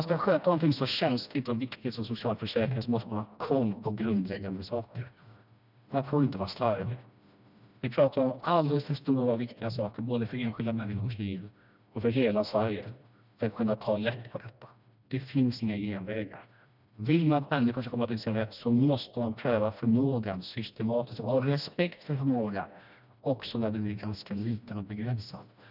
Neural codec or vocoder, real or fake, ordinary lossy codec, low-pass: codec, 16 kHz, 1 kbps, FreqCodec, smaller model; fake; none; 5.4 kHz